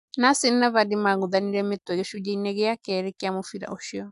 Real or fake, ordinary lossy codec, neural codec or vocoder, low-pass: real; none; none; 10.8 kHz